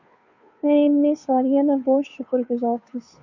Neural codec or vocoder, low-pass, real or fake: codec, 16 kHz, 2 kbps, FunCodec, trained on Chinese and English, 25 frames a second; 7.2 kHz; fake